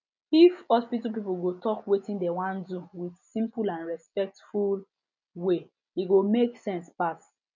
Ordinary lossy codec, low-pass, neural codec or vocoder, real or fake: none; 7.2 kHz; none; real